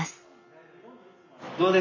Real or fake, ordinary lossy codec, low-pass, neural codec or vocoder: real; AAC, 32 kbps; 7.2 kHz; none